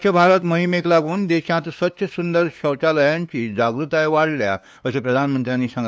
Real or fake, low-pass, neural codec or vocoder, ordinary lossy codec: fake; none; codec, 16 kHz, 2 kbps, FunCodec, trained on LibriTTS, 25 frames a second; none